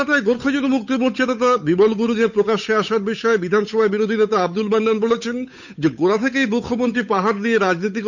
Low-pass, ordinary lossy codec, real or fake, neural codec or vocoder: 7.2 kHz; none; fake; codec, 16 kHz, 8 kbps, FunCodec, trained on Chinese and English, 25 frames a second